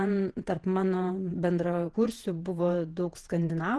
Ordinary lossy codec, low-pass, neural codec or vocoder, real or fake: Opus, 16 kbps; 10.8 kHz; vocoder, 48 kHz, 128 mel bands, Vocos; fake